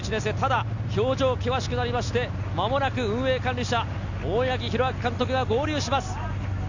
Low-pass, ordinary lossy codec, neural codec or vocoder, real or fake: 7.2 kHz; none; none; real